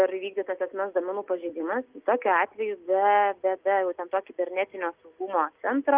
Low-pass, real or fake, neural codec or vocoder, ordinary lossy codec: 3.6 kHz; real; none; Opus, 32 kbps